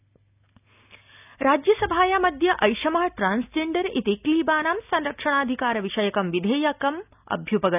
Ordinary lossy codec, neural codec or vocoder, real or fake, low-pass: none; none; real; 3.6 kHz